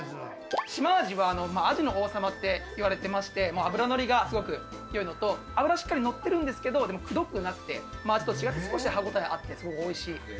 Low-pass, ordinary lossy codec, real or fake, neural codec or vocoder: none; none; real; none